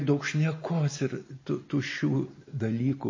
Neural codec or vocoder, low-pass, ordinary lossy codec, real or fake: none; 7.2 kHz; MP3, 32 kbps; real